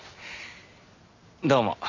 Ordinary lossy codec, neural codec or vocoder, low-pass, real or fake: AAC, 48 kbps; none; 7.2 kHz; real